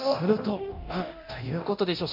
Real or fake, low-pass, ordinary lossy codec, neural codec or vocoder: fake; 5.4 kHz; MP3, 48 kbps; codec, 24 kHz, 0.9 kbps, DualCodec